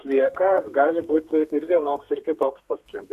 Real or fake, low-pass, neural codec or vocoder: fake; 14.4 kHz; codec, 32 kHz, 1.9 kbps, SNAC